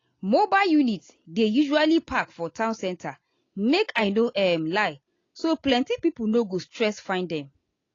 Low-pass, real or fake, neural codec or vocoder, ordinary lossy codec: 7.2 kHz; real; none; AAC, 32 kbps